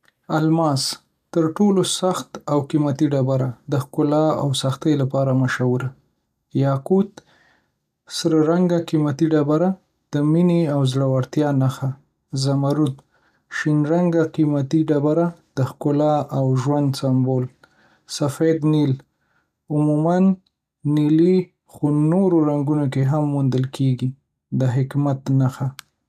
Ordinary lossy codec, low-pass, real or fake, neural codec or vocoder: none; 14.4 kHz; real; none